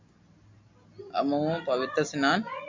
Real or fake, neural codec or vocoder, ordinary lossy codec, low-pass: real; none; MP3, 64 kbps; 7.2 kHz